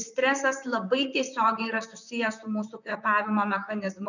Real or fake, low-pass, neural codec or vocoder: fake; 7.2 kHz; vocoder, 44.1 kHz, 128 mel bands, Pupu-Vocoder